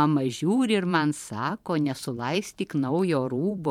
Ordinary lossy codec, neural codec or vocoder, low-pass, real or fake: MP3, 96 kbps; vocoder, 44.1 kHz, 128 mel bands every 512 samples, BigVGAN v2; 19.8 kHz; fake